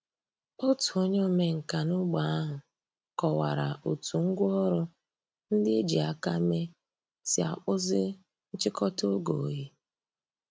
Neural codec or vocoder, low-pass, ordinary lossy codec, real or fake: none; none; none; real